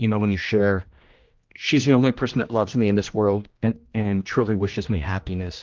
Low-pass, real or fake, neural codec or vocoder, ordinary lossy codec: 7.2 kHz; fake; codec, 16 kHz, 1 kbps, X-Codec, HuBERT features, trained on general audio; Opus, 24 kbps